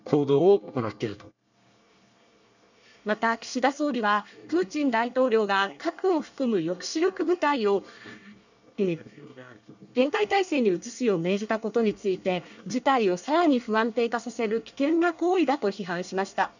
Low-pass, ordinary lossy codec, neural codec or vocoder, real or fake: 7.2 kHz; none; codec, 24 kHz, 1 kbps, SNAC; fake